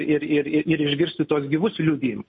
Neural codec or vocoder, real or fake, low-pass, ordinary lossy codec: none; real; 10.8 kHz; MP3, 32 kbps